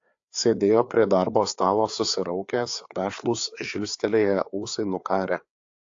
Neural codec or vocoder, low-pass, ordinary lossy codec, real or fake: codec, 16 kHz, 4 kbps, FreqCodec, larger model; 7.2 kHz; AAC, 48 kbps; fake